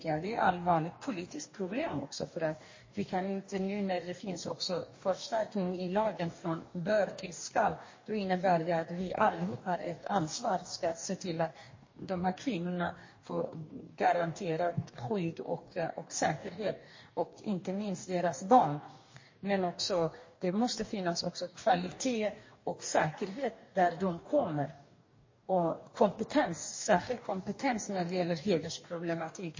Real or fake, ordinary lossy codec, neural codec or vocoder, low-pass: fake; MP3, 32 kbps; codec, 44.1 kHz, 2.6 kbps, DAC; 7.2 kHz